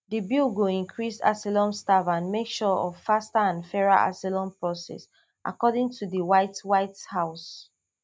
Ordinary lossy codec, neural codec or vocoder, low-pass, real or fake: none; none; none; real